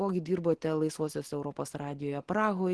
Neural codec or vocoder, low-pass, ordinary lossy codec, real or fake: none; 10.8 kHz; Opus, 16 kbps; real